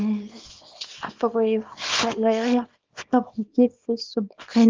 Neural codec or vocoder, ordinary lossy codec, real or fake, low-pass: codec, 24 kHz, 0.9 kbps, WavTokenizer, small release; Opus, 32 kbps; fake; 7.2 kHz